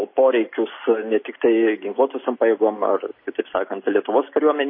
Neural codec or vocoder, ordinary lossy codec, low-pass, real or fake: none; MP3, 24 kbps; 5.4 kHz; real